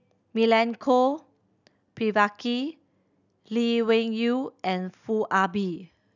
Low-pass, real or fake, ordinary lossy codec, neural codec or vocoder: 7.2 kHz; real; none; none